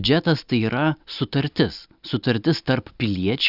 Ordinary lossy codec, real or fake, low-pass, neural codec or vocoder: Opus, 64 kbps; real; 5.4 kHz; none